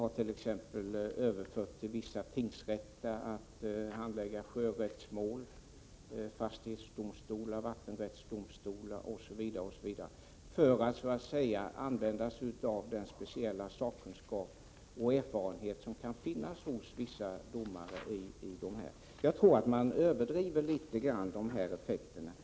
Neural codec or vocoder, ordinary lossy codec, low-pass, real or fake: none; none; none; real